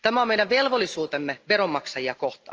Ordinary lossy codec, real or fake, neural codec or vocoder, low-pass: Opus, 24 kbps; real; none; 7.2 kHz